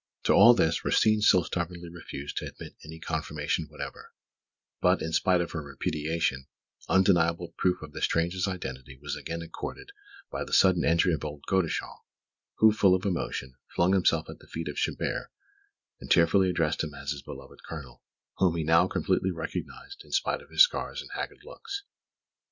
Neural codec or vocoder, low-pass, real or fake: none; 7.2 kHz; real